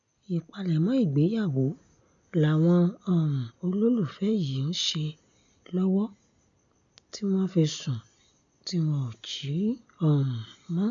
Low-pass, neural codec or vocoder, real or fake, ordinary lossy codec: 7.2 kHz; none; real; none